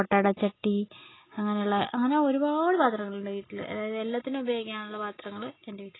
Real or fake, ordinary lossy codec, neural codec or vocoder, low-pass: real; AAC, 16 kbps; none; 7.2 kHz